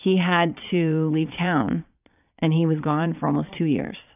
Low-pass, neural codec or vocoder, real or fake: 3.6 kHz; vocoder, 22.05 kHz, 80 mel bands, Vocos; fake